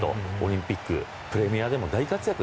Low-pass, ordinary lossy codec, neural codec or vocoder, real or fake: none; none; none; real